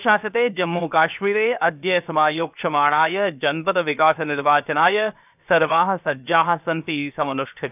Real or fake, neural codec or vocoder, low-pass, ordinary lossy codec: fake; codec, 16 kHz, 0.7 kbps, FocalCodec; 3.6 kHz; none